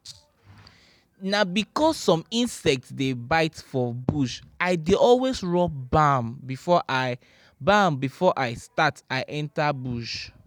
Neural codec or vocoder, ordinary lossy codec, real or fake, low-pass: none; none; real; none